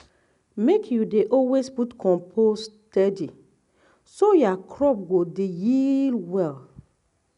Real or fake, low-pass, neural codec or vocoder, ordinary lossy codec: real; 10.8 kHz; none; none